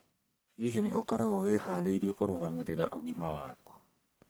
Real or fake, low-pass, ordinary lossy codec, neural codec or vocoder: fake; none; none; codec, 44.1 kHz, 1.7 kbps, Pupu-Codec